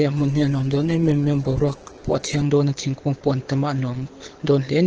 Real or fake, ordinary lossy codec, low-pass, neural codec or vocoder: fake; Opus, 16 kbps; 7.2 kHz; codec, 24 kHz, 6 kbps, HILCodec